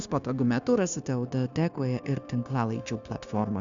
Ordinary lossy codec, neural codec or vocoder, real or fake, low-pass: Opus, 64 kbps; codec, 16 kHz, 0.9 kbps, LongCat-Audio-Codec; fake; 7.2 kHz